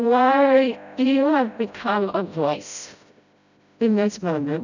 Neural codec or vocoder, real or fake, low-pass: codec, 16 kHz, 0.5 kbps, FreqCodec, smaller model; fake; 7.2 kHz